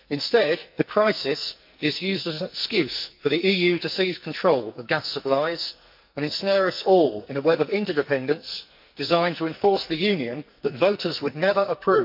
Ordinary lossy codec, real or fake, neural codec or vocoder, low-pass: MP3, 48 kbps; fake; codec, 44.1 kHz, 2.6 kbps, SNAC; 5.4 kHz